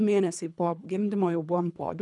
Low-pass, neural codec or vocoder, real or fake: 10.8 kHz; codec, 24 kHz, 3 kbps, HILCodec; fake